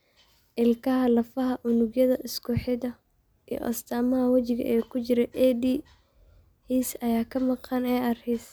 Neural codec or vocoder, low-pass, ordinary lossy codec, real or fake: none; none; none; real